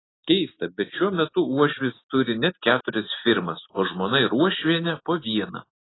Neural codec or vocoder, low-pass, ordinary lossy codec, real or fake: none; 7.2 kHz; AAC, 16 kbps; real